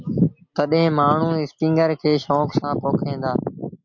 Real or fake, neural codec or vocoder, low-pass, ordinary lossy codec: real; none; 7.2 kHz; MP3, 48 kbps